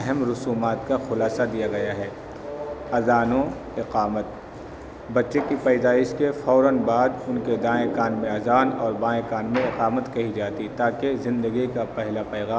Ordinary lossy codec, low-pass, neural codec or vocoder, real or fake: none; none; none; real